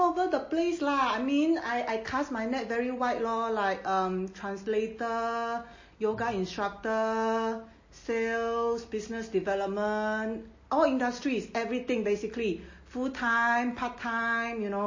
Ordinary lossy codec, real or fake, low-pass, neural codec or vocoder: MP3, 32 kbps; real; 7.2 kHz; none